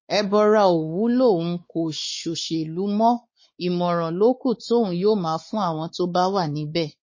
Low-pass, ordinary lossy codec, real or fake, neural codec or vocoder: 7.2 kHz; MP3, 32 kbps; fake; codec, 16 kHz, 4 kbps, X-Codec, WavLM features, trained on Multilingual LibriSpeech